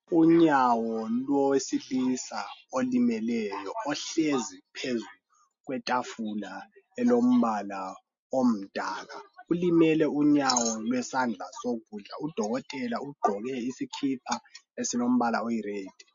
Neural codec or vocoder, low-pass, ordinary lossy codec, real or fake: none; 7.2 kHz; MP3, 48 kbps; real